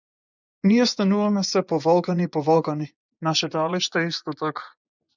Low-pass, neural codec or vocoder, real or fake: 7.2 kHz; vocoder, 24 kHz, 100 mel bands, Vocos; fake